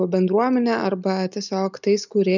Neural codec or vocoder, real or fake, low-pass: none; real; 7.2 kHz